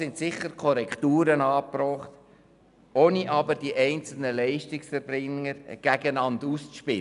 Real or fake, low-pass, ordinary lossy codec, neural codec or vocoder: real; 10.8 kHz; none; none